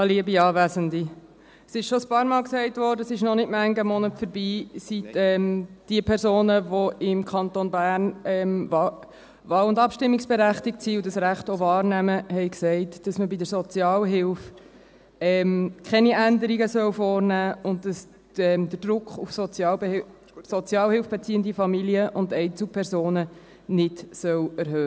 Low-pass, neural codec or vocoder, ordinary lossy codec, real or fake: none; none; none; real